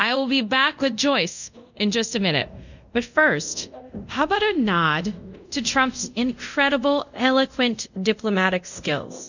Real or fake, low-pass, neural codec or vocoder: fake; 7.2 kHz; codec, 24 kHz, 0.5 kbps, DualCodec